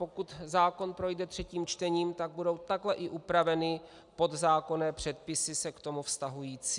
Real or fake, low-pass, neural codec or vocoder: real; 10.8 kHz; none